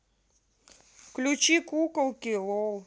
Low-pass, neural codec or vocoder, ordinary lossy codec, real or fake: none; none; none; real